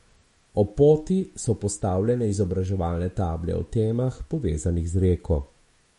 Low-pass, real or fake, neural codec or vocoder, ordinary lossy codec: 19.8 kHz; fake; autoencoder, 48 kHz, 128 numbers a frame, DAC-VAE, trained on Japanese speech; MP3, 48 kbps